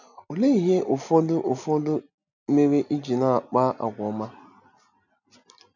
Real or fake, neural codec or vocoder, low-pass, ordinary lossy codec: real; none; 7.2 kHz; none